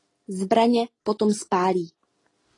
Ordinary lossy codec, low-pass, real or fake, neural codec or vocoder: AAC, 32 kbps; 10.8 kHz; real; none